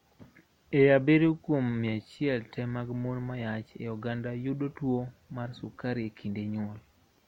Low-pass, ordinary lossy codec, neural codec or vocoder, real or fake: 19.8 kHz; MP3, 64 kbps; none; real